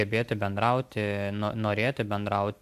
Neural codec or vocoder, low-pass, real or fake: none; 14.4 kHz; real